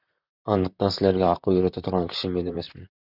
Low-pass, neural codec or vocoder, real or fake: 5.4 kHz; vocoder, 44.1 kHz, 128 mel bands, Pupu-Vocoder; fake